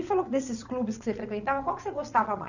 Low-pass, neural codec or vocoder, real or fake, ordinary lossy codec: 7.2 kHz; vocoder, 22.05 kHz, 80 mel bands, WaveNeXt; fake; none